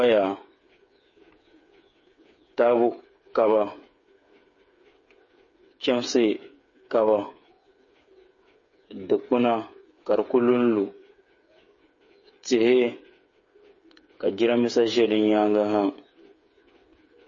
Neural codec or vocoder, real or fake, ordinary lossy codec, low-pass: codec, 16 kHz, 8 kbps, FreqCodec, smaller model; fake; MP3, 32 kbps; 7.2 kHz